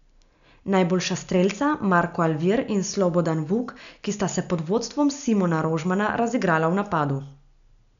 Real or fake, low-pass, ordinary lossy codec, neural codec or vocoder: real; 7.2 kHz; none; none